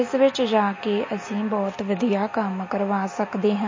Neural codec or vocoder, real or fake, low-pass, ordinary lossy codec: none; real; 7.2 kHz; MP3, 32 kbps